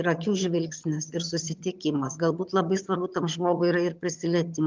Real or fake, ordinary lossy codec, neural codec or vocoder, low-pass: fake; Opus, 24 kbps; vocoder, 22.05 kHz, 80 mel bands, HiFi-GAN; 7.2 kHz